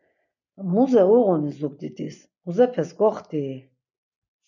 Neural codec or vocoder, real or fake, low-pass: none; real; 7.2 kHz